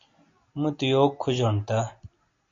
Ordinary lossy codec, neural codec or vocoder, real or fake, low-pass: AAC, 48 kbps; none; real; 7.2 kHz